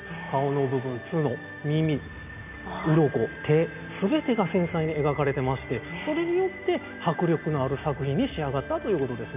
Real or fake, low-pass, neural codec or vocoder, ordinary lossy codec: real; 3.6 kHz; none; none